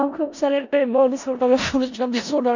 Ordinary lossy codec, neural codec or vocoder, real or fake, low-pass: none; codec, 16 kHz in and 24 kHz out, 0.4 kbps, LongCat-Audio-Codec, four codebook decoder; fake; 7.2 kHz